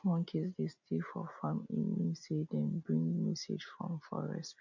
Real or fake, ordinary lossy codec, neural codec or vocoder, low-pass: real; none; none; 7.2 kHz